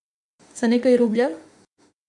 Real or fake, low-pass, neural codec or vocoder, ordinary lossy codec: fake; 10.8 kHz; autoencoder, 48 kHz, 32 numbers a frame, DAC-VAE, trained on Japanese speech; MP3, 64 kbps